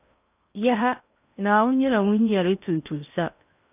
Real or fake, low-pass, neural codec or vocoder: fake; 3.6 kHz; codec, 16 kHz in and 24 kHz out, 0.8 kbps, FocalCodec, streaming, 65536 codes